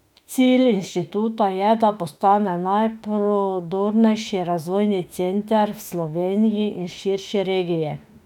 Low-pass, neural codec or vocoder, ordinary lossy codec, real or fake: 19.8 kHz; autoencoder, 48 kHz, 32 numbers a frame, DAC-VAE, trained on Japanese speech; none; fake